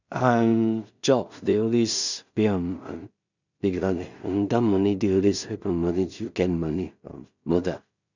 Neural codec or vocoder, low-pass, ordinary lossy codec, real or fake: codec, 16 kHz in and 24 kHz out, 0.4 kbps, LongCat-Audio-Codec, two codebook decoder; 7.2 kHz; none; fake